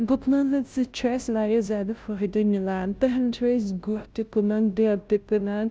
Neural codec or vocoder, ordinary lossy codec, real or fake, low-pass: codec, 16 kHz, 0.5 kbps, FunCodec, trained on Chinese and English, 25 frames a second; none; fake; none